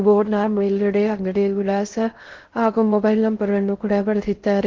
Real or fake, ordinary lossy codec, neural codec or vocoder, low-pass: fake; Opus, 16 kbps; codec, 16 kHz in and 24 kHz out, 0.6 kbps, FocalCodec, streaming, 2048 codes; 7.2 kHz